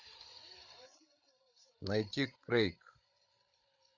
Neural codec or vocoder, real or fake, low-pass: codec, 16 kHz, 8 kbps, FreqCodec, larger model; fake; 7.2 kHz